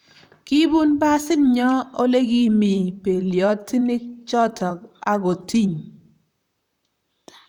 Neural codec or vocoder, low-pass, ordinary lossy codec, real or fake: vocoder, 44.1 kHz, 128 mel bands, Pupu-Vocoder; 19.8 kHz; none; fake